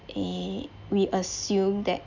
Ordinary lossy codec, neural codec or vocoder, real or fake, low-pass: none; none; real; 7.2 kHz